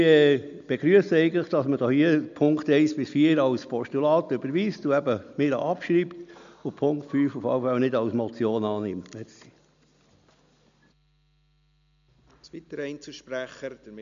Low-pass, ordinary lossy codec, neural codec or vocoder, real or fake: 7.2 kHz; MP3, 64 kbps; none; real